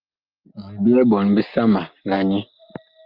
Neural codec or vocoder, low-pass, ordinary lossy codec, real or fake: none; 5.4 kHz; Opus, 24 kbps; real